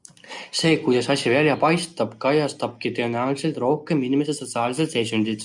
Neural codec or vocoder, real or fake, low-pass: none; real; 10.8 kHz